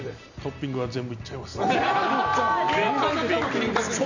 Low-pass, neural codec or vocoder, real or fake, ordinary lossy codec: 7.2 kHz; none; real; none